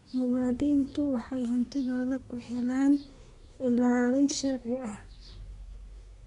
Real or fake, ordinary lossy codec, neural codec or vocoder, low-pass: fake; none; codec, 24 kHz, 1 kbps, SNAC; 10.8 kHz